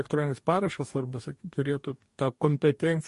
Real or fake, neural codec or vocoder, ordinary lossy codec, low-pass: fake; codec, 44.1 kHz, 2.6 kbps, DAC; MP3, 48 kbps; 14.4 kHz